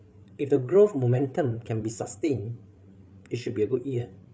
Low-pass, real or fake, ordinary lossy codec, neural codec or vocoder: none; fake; none; codec, 16 kHz, 8 kbps, FreqCodec, larger model